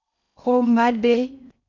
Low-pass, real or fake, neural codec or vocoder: 7.2 kHz; fake; codec, 16 kHz in and 24 kHz out, 0.6 kbps, FocalCodec, streaming, 4096 codes